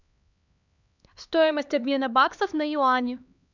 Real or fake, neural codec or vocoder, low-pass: fake; codec, 16 kHz, 2 kbps, X-Codec, HuBERT features, trained on LibriSpeech; 7.2 kHz